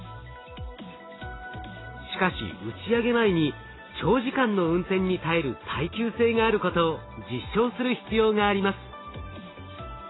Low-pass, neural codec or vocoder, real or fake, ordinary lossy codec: 7.2 kHz; none; real; AAC, 16 kbps